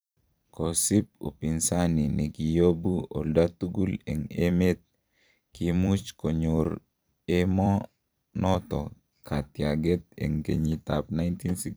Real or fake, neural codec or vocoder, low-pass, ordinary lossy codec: fake; vocoder, 44.1 kHz, 128 mel bands every 256 samples, BigVGAN v2; none; none